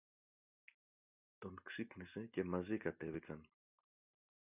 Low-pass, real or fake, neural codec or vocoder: 3.6 kHz; real; none